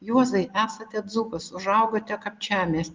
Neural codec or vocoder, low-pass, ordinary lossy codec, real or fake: none; 7.2 kHz; Opus, 32 kbps; real